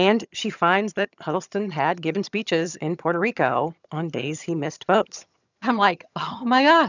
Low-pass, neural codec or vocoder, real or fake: 7.2 kHz; vocoder, 22.05 kHz, 80 mel bands, HiFi-GAN; fake